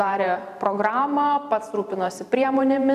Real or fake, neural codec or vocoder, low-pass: fake; vocoder, 44.1 kHz, 128 mel bands every 512 samples, BigVGAN v2; 14.4 kHz